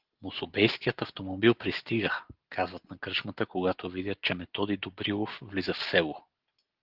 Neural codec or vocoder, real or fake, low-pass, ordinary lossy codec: none; real; 5.4 kHz; Opus, 16 kbps